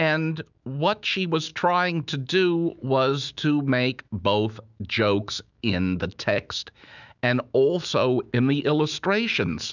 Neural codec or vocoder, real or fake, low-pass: autoencoder, 48 kHz, 128 numbers a frame, DAC-VAE, trained on Japanese speech; fake; 7.2 kHz